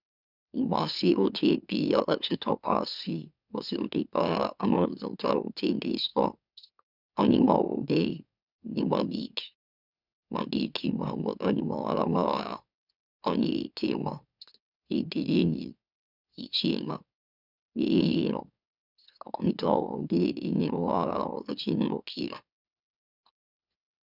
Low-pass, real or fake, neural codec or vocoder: 5.4 kHz; fake; autoencoder, 44.1 kHz, a latent of 192 numbers a frame, MeloTTS